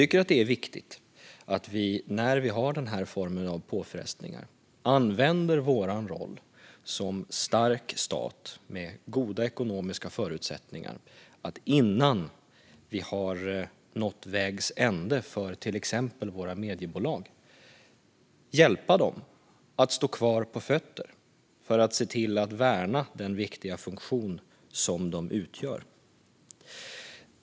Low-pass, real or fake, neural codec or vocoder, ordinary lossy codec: none; real; none; none